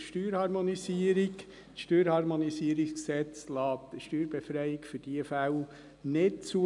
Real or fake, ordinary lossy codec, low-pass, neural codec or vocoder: real; none; 10.8 kHz; none